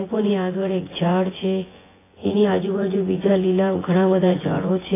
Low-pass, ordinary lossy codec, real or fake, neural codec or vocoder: 3.6 kHz; AAC, 16 kbps; fake; vocoder, 24 kHz, 100 mel bands, Vocos